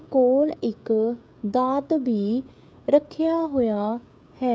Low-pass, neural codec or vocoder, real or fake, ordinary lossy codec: none; codec, 16 kHz, 16 kbps, FreqCodec, smaller model; fake; none